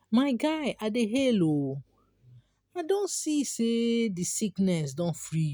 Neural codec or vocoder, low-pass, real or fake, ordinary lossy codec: none; none; real; none